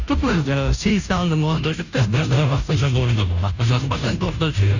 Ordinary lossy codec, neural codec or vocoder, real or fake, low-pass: none; codec, 16 kHz, 0.5 kbps, FunCodec, trained on Chinese and English, 25 frames a second; fake; 7.2 kHz